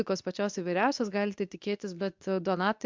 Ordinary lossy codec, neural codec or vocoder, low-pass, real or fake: MP3, 64 kbps; codec, 24 kHz, 0.9 kbps, WavTokenizer, medium speech release version 2; 7.2 kHz; fake